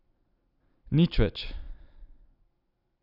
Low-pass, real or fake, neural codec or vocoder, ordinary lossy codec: 5.4 kHz; real; none; none